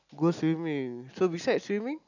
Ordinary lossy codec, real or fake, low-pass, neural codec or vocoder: none; real; 7.2 kHz; none